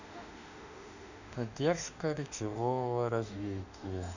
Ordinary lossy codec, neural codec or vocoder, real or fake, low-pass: none; autoencoder, 48 kHz, 32 numbers a frame, DAC-VAE, trained on Japanese speech; fake; 7.2 kHz